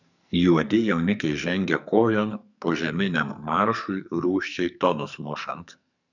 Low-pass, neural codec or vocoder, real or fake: 7.2 kHz; codec, 44.1 kHz, 2.6 kbps, SNAC; fake